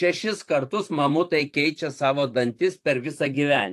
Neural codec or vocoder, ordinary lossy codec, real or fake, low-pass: vocoder, 44.1 kHz, 128 mel bands, Pupu-Vocoder; AAC, 64 kbps; fake; 14.4 kHz